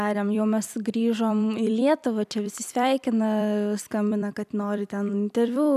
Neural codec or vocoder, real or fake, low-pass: vocoder, 44.1 kHz, 128 mel bands every 256 samples, BigVGAN v2; fake; 14.4 kHz